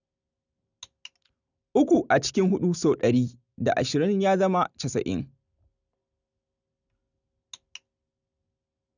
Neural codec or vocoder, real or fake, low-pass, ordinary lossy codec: none; real; 7.2 kHz; none